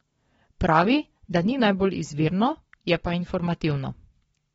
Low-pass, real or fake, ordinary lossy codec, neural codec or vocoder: 19.8 kHz; fake; AAC, 24 kbps; codec, 44.1 kHz, 7.8 kbps, Pupu-Codec